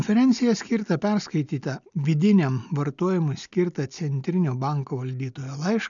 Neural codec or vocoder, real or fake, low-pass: none; real; 7.2 kHz